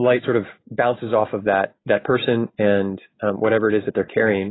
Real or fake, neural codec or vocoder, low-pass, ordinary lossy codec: real; none; 7.2 kHz; AAC, 16 kbps